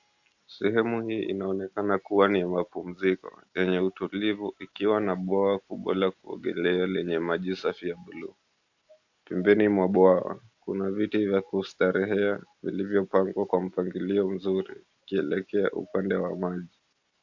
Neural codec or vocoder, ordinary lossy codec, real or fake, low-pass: none; AAC, 48 kbps; real; 7.2 kHz